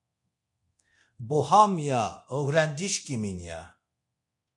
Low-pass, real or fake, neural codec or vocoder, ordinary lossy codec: 10.8 kHz; fake; codec, 24 kHz, 0.9 kbps, DualCodec; AAC, 64 kbps